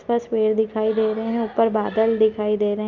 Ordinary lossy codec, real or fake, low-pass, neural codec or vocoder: Opus, 24 kbps; real; 7.2 kHz; none